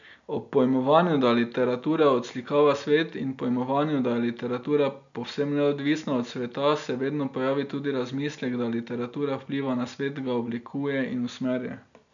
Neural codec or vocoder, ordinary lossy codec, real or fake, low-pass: none; none; real; 7.2 kHz